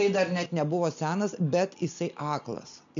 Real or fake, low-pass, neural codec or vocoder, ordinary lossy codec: real; 7.2 kHz; none; MP3, 64 kbps